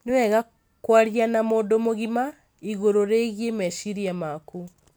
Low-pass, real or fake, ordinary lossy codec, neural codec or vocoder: none; real; none; none